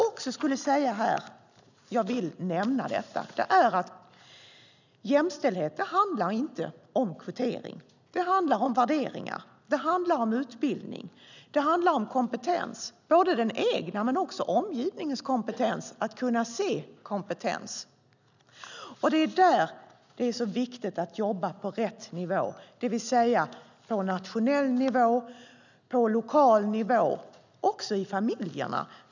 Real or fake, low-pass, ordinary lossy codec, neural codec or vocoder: real; 7.2 kHz; none; none